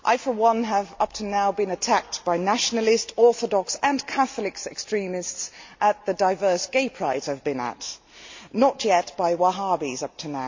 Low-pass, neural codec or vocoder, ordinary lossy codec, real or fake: 7.2 kHz; none; MP3, 48 kbps; real